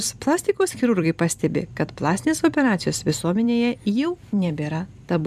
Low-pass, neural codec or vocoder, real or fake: 14.4 kHz; vocoder, 44.1 kHz, 128 mel bands every 256 samples, BigVGAN v2; fake